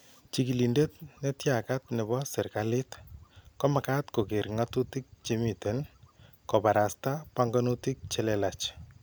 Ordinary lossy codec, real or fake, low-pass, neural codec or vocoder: none; real; none; none